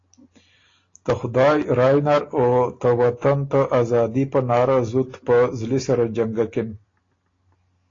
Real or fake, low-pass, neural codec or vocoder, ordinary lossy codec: real; 7.2 kHz; none; AAC, 32 kbps